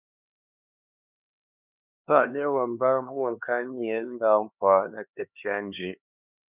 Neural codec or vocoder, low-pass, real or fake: codec, 16 kHz, 2 kbps, X-Codec, HuBERT features, trained on LibriSpeech; 3.6 kHz; fake